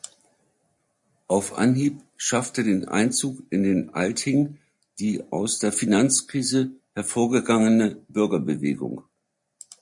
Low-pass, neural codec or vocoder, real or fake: 10.8 kHz; none; real